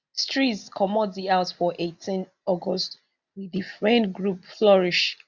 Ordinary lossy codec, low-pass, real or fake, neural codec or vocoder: none; 7.2 kHz; real; none